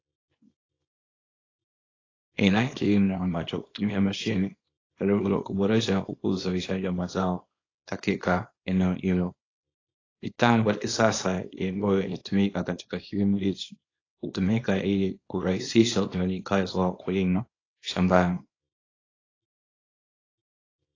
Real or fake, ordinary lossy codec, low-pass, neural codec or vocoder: fake; AAC, 32 kbps; 7.2 kHz; codec, 24 kHz, 0.9 kbps, WavTokenizer, small release